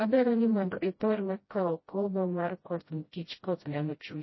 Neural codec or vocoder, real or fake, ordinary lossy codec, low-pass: codec, 16 kHz, 0.5 kbps, FreqCodec, smaller model; fake; MP3, 24 kbps; 7.2 kHz